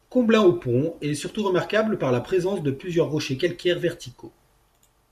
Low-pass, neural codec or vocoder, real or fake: 14.4 kHz; none; real